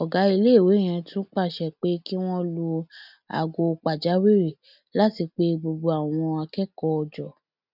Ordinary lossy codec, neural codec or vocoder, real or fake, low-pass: none; none; real; 5.4 kHz